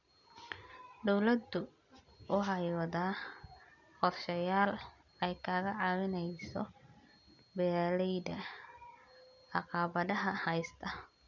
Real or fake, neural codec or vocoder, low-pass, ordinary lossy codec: real; none; 7.2 kHz; none